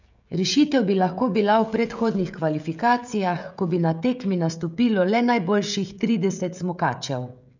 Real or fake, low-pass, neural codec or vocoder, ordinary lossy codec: fake; 7.2 kHz; codec, 16 kHz, 16 kbps, FreqCodec, smaller model; none